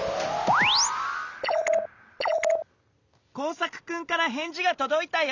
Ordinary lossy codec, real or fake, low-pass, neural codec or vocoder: none; real; 7.2 kHz; none